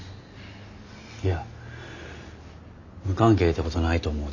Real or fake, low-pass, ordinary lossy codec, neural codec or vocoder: real; 7.2 kHz; none; none